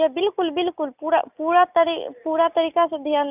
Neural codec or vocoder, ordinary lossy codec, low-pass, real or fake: none; none; 3.6 kHz; real